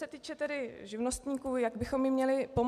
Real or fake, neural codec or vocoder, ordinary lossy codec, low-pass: real; none; MP3, 96 kbps; 14.4 kHz